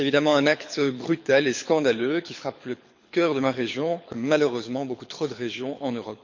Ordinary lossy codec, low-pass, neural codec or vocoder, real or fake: MP3, 48 kbps; 7.2 kHz; codec, 24 kHz, 6 kbps, HILCodec; fake